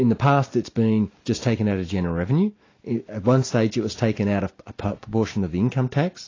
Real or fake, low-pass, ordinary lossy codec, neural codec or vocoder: real; 7.2 kHz; AAC, 32 kbps; none